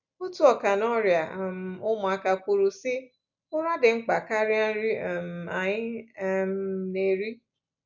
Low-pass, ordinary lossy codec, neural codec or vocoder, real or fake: 7.2 kHz; none; none; real